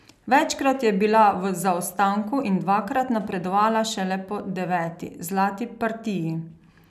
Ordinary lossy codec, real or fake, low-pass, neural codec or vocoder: none; real; 14.4 kHz; none